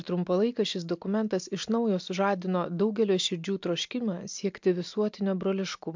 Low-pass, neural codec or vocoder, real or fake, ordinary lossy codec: 7.2 kHz; none; real; MP3, 64 kbps